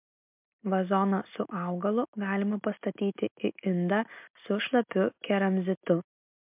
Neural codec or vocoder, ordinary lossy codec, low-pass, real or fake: none; MP3, 32 kbps; 3.6 kHz; real